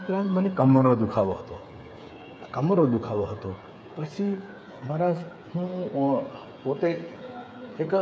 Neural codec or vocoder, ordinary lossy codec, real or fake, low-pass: codec, 16 kHz, 8 kbps, FreqCodec, smaller model; none; fake; none